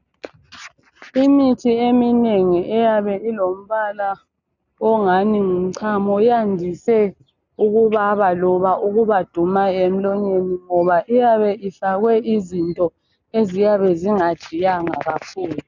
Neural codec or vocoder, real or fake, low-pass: none; real; 7.2 kHz